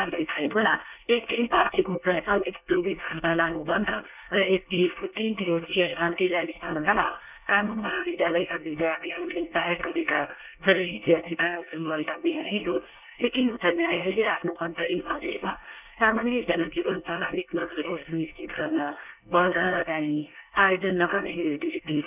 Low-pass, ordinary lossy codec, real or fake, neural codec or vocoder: 3.6 kHz; none; fake; codec, 24 kHz, 1 kbps, SNAC